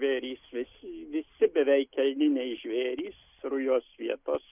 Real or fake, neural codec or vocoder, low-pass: real; none; 3.6 kHz